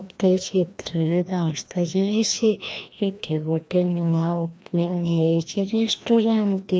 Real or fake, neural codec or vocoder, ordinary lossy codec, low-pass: fake; codec, 16 kHz, 1 kbps, FreqCodec, larger model; none; none